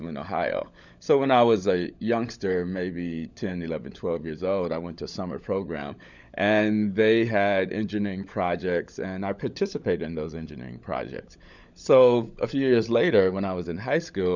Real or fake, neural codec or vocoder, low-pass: fake; codec, 16 kHz, 16 kbps, FunCodec, trained on LibriTTS, 50 frames a second; 7.2 kHz